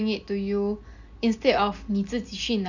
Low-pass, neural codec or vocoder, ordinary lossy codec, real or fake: 7.2 kHz; none; none; real